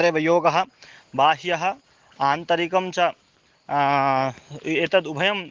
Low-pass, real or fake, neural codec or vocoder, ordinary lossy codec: 7.2 kHz; real; none; Opus, 16 kbps